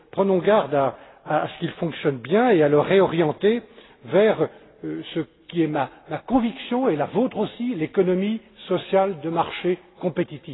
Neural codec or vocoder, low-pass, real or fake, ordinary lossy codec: none; 7.2 kHz; real; AAC, 16 kbps